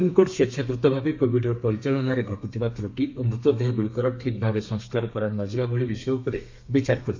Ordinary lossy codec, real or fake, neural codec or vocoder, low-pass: MP3, 48 kbps; fake; codec, 32 kHz, 1.9 kbps, SNAC; 7.2 kHz